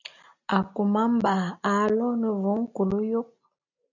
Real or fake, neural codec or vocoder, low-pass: real; none; 7.2 kHz